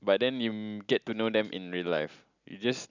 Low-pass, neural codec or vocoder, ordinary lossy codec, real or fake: 7.2 kHz; none; none; real